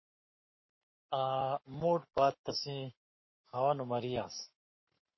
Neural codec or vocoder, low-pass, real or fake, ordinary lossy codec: codec, 44.1 kHz, 7.8 kbps, Pupu-Codec; 7.2 kHz; fake; MP3, 24 kbps